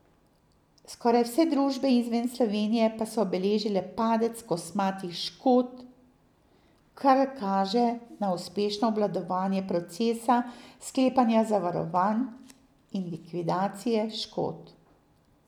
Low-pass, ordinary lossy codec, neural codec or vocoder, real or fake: 19.8 kHz; none; none; real